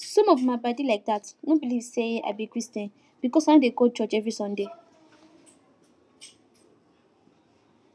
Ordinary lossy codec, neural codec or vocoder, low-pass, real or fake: none; none; none; real